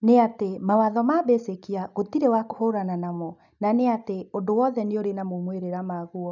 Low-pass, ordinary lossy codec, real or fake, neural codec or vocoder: 7.2 kHz; none; real; none